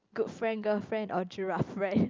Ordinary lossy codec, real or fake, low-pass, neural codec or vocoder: Opus, 24 kbps; real; 7.2 kHz; none